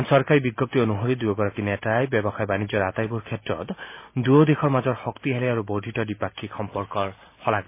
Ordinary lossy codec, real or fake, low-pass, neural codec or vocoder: MP3, 24 kbps; real; 3.6 kHz; none